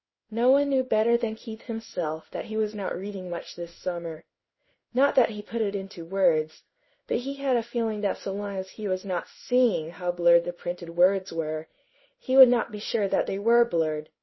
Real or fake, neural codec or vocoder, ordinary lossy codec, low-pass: fake; codec, 16 kHz in and 24 kHz out, 1 kbps, XY-Tokenizer; MP3, 24 kbps; 7.2 kHz